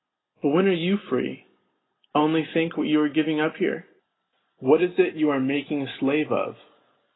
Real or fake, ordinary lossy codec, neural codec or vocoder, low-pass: real; AAC, 16 kbps; none; 7.2 kHz